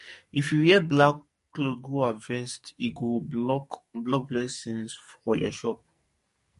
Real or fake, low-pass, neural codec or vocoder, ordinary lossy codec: fake; 14.4 kHz; codec, 44.1 kHz, 2.6 kbps, SNAC; MP3, 48 kbps